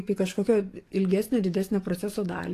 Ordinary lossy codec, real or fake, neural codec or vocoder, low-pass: AAC, 48 kbps; fake; codec, 44.1 kHz, 7.8 kbps, DAC; 14.4 kHz